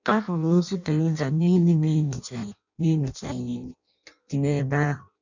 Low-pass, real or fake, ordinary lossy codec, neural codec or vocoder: 7.2 kHz; fake; none; codec, 16 kHz in and 24 kHz out, 0.6 kbps, FireRedTTS-2 codec